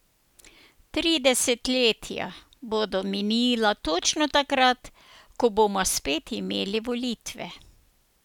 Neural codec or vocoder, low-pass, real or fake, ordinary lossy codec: none; 19.8 kHz; real; none